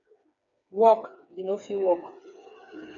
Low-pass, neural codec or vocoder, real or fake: 7.2 kHz; codec, 16 kHz, 4 kbps, FreqCodec, smaller model; fake